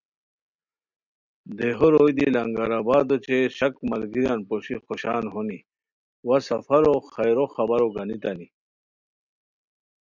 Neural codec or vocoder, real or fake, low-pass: none; real; 7.2 kHz